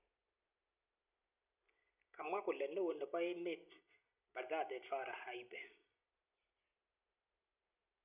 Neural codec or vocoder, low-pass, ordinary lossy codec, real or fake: none; 3.6 kHz; none; real